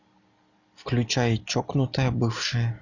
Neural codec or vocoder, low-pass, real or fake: none; 7.2 kHz; real